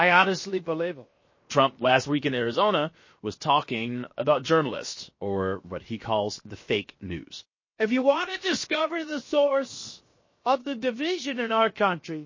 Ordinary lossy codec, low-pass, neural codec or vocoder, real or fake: MP3, 32 kbps; 7.2 kHz; codec, 16 kHz, 0.7 kbps, FocalCodec; fake